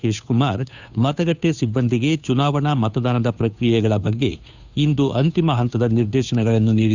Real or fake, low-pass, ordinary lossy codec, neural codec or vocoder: fake; 7.2 kHz; none; codec, 16 kHz, 2 kbps, FunCodec, trained on Chinese and English, 25 frames a second